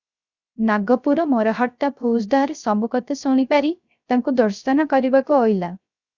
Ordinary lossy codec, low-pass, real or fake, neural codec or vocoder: Opus, 64 kbps; 7.2 kHz; fake; codec, 16 kHz, 0.3 kbps, FocalCodec